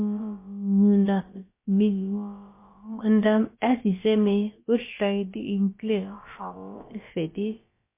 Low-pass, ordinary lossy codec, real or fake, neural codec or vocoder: 3.6 kHz; MP3, 24 kbps; fake; codec, 16 kHz, about 1 kbps, DyCAST, with the encoder's durations